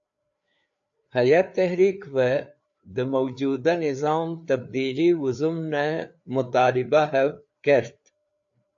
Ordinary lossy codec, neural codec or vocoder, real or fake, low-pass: AAC, 64 kbps; codec, 16 kHz, 4 kbps, FreqCodec, larger model; fake; 7.2 kHz